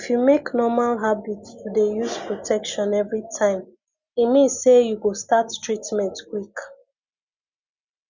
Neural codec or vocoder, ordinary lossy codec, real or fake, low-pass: none; Opus, 64 kbps; real; 7.2 kHz